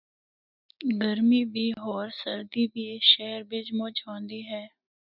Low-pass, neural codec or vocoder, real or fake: 5.4 kHz; none; real